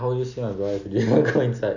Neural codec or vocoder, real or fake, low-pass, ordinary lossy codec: none; real; 7.2 kHz; none